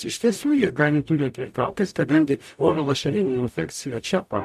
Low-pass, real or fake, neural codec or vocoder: 14.4 kHz; fake; codec, 44.1 kHz, 0.9 kbps, DAC